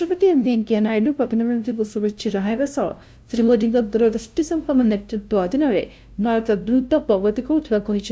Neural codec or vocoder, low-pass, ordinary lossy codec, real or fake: codec, 16 kHz, 0.5 kbps, FunCodec, trained on LibriTTS, 25 frames a second; none; none; fake